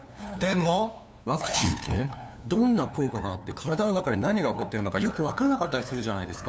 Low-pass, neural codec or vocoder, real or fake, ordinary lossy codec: none; codec, 16 kHz, 2 kbps, FunCodec, trained on LibriTTS, 25 frames a second; fake; none